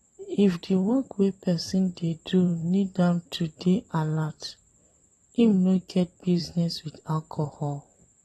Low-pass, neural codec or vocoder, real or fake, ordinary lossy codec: 19.8 kHz; vocoder, 44.1 kHz, 128 mel bands every 512 samples, BigVGAN v2; fake; AAC, 32 kbps